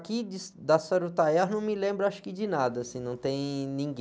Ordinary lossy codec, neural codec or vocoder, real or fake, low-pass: none; none; real; none